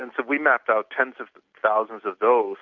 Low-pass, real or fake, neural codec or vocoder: 7.2 kHz; real; none